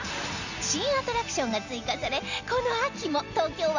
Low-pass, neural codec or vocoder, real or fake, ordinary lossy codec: 7.2 kHz; none; real; none